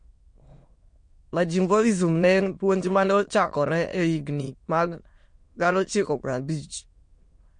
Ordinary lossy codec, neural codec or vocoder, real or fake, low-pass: MP3, 48 kbps; autoencoder, 22.05 kHz, a latent of 192 numbers a frame, VITS, trained on many speakers; fake; 9.9 kHz